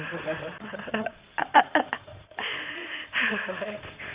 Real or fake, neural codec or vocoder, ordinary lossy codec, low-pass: fake; codec, 16 kHz in and 24 kHz out, 2.2 kbps, FireRedTTS-2 codec; Opus, 64 kbps; 3.6 kHz